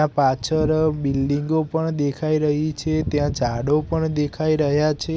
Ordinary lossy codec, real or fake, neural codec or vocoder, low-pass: none; real; none; none